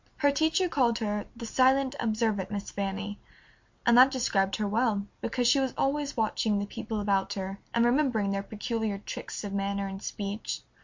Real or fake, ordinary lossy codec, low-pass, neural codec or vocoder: real; MP3, 48 kbps; 7.2 kHz; none